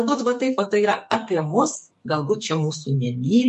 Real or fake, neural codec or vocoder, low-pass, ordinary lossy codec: fake; codec, 44.1 kHz, 2.6 kbps, SNAC; 14.4 kHz; MP3, 48 kbps